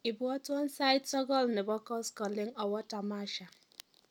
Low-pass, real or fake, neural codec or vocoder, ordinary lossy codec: 19.8 kHz; real; none; none